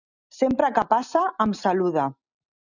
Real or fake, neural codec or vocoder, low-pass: real; none; 7.2 kHz